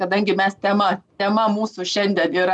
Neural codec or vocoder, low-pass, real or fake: none; 10.8 kHz; real